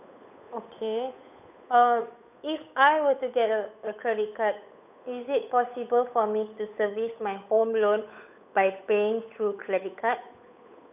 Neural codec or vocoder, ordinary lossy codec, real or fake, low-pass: codec, 16 kHz, 8 kbps, FunCodec, trained on Chinese and English, 25 frames a second; none; fake; 3.6 kHz